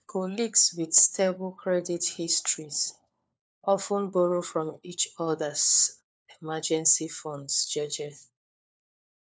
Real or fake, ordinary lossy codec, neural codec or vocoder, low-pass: fake; none; codec, 16 kHz, 4 kbps, FunCodec, trained on LibriTTS, 50 frames a second; none